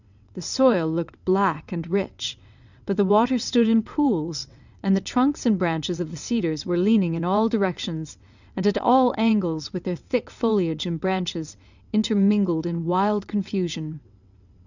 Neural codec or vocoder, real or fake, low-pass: vocoder, 22.05 kHz, 80 mel bands, WaveNeXt; fake; 7.2 kHz